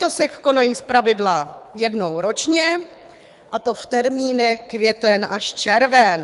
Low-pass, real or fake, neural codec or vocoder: 10.8 kHz; fake; codec, 24 kHz, 3 kbps, HILCodec